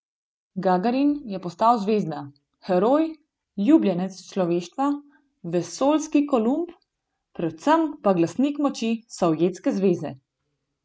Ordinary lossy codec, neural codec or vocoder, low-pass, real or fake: none; none; none; real